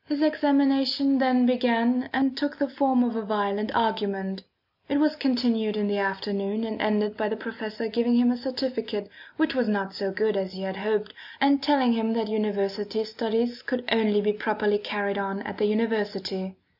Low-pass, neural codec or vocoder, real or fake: 5.4 kHz; none; real